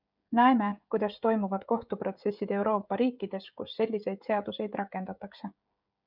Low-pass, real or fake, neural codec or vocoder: 5.4 kHz; fake; codec, 16 kHz, 16 kbps, FreqCodec, smaller model